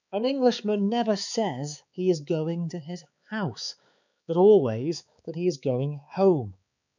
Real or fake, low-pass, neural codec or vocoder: fake; 7.2 kHz; codec, 16 kHz, 4 kbps, X-Codec, HuBERT features, trained on balanced general audio